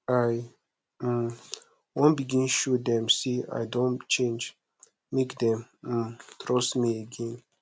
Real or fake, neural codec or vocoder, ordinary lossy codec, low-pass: real; none; none; none